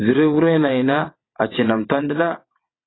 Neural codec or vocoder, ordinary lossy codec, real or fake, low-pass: vocoder, 22.05 kHz, 80 mel bands, WaveNeXt; AAC, 16 kbps; fake; 7.2 kHz